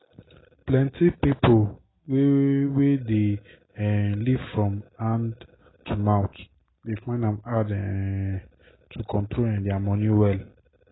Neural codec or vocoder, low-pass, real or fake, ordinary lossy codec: none; 7.2 kHz; real; AAC, 16 kbps